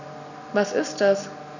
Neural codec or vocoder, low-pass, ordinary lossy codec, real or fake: none; 7.2 kHz; none; real